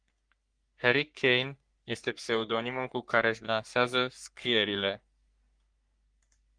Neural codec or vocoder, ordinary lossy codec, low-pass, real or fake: codec, 44.1 kHz, 3.4 kbps, Pupu-Codec; Opus, 32 kbps; 9.9 kHz; fake